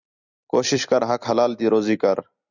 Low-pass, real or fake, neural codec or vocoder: 7.2 kHz; fake; vocoder, 44.1 kHz, 128 mel bands every 256 samples, BigVGAN v2